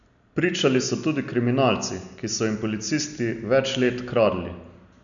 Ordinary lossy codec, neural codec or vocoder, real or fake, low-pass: none; none; real; 7.2 kHz